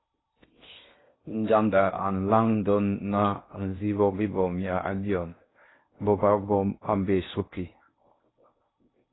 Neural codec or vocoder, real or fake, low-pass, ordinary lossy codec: codec, 16 kHz in and 24 kHz out, 0.6 kbps, FocalCodec, streaming, 4096 codes; fake; 7.2 kHz; AAC, 16 kbps